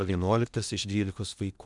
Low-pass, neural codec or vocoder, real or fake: 10.8 kHz; codec, 16 kHz in and 24 kHz out, 0.8 kbps, FocalCodec, streaming, 65536 codes; fake